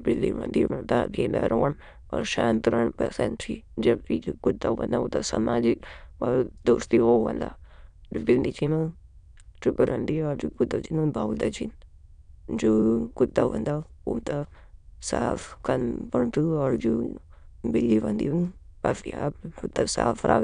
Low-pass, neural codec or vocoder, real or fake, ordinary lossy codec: 9.9 kHz; autoencoder, 22.05 kHz, a latent of 192 numbers a frame, VITS, trained on many speakers; fake; none